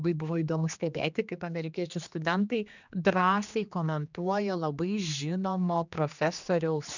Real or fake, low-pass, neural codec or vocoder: fake; 7.2 kHz; codec, 16 kHz, 2 kbps, X-Codec, HuBERT features, trained on general audio